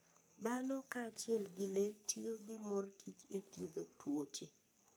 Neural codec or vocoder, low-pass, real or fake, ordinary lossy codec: codec, 44.1 kHz, 3.4 kbps, Pupu-Codec; none; fake; none